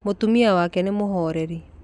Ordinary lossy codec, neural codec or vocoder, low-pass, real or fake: none; none; 10.8 kHz; real